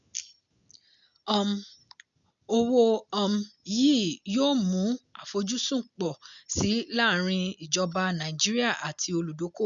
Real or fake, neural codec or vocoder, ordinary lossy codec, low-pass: real; none; none; 7.2 kHz